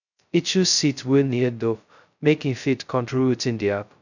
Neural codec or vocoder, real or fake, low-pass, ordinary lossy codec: codec, 16 kHz, 0.2 kbps, FocalCodec; fake; 7.2 kHz; none